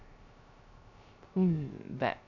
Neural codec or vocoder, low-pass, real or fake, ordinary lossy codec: codec, 16 kHz, 0.3 kbps, FocalCodec; 7.2 kHz; fake; none